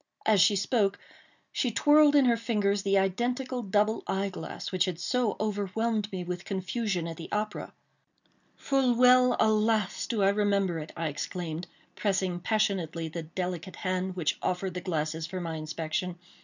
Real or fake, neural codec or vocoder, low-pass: real; none; 7.2 kHz